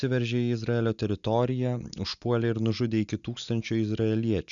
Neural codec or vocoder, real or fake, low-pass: none; real; 7.2 kHz